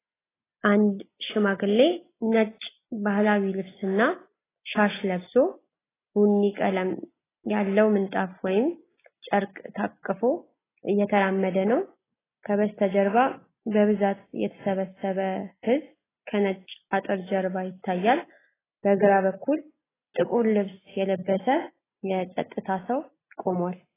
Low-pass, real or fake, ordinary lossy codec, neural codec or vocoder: 3.6 kHz; real; AAC, 16 kbps; none